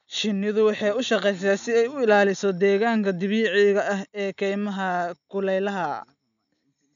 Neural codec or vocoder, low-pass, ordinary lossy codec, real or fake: none; 7.2 kHz; none; real